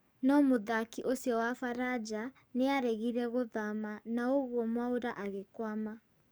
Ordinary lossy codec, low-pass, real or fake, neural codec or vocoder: none; none; fake; codec, 44.1 kHz, 7.8 kbps, DAC